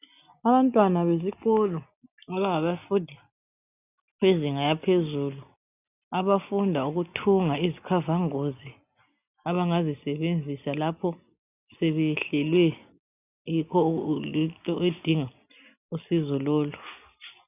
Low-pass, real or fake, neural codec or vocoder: 3.6 kHz; real; none